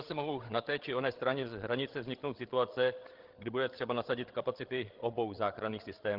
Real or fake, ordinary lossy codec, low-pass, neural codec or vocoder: fake; Opus, 16 kbps; 5.4 kHz; codec, 16 kHz, 16 kbps, FreqCodec, larger model